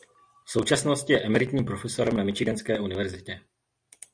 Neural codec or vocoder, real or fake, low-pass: none; real; 9.9 kHz